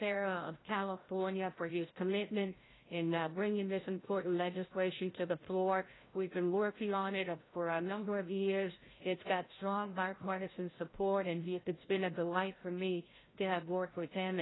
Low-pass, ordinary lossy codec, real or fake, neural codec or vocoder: 7.2 kHz; AAC, 16 kbps; fake; codec, 16 kHz, 0.5 kbps, FreqCodec, larger model